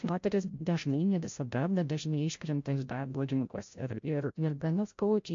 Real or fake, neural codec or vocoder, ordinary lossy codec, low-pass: fake; codec, 16 kHz, 0.5 kbps, FreqCodec, larger model; MP3, 48 kbps; 7.2 kHz